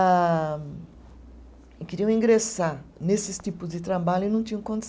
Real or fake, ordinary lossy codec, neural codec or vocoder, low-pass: real; none; none; none